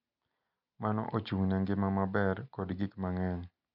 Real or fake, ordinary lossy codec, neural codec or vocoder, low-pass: real; none; none; 5.4 kHz